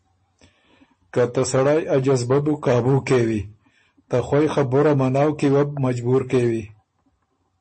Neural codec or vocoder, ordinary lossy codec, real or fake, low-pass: none; MP3, 32 kbps; real; 10.8 kHz